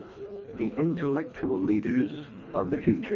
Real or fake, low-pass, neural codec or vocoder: fake; 7.2 kHz; codec, 24 kHz, 1.5 kbps, HILCodec